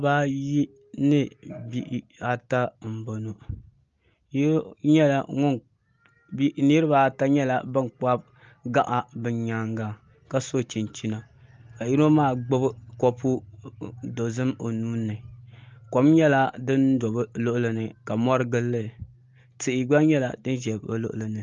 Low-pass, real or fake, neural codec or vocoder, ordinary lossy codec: 7.2 kHz; real; none; Opus, 32 kbps